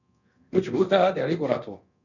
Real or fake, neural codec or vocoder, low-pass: fake; codec, 24 kHz, 0.9 kbps, DualCodec; 7.2 kHz